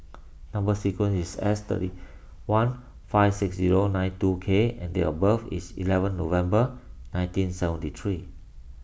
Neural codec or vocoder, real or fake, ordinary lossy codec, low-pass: none; real; none; none